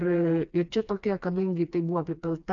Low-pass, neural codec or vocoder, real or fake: 7.2 kHz; codec, 16 kHz, 2 kbps, FreqCodec, smaller model; fake